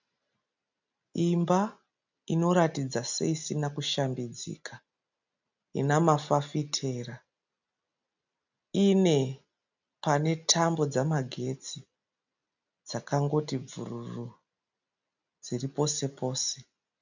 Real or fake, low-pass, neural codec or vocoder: real; 7.2 kHz; none